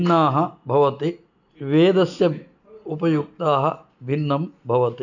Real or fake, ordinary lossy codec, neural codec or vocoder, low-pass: real; none; none; 7.2 kHz